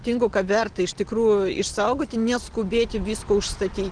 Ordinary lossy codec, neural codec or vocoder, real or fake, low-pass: Opus, 16 kbps; none; real; 9.9 kHz